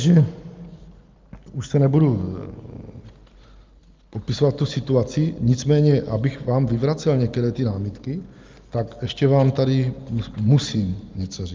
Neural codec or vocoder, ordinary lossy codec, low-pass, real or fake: none; Opus, 24 kbps; 7.2 kHz; real